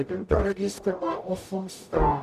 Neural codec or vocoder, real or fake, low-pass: codec, 44.1 kHz, 0.9 kbps, DAC; fake; 14.4 kHz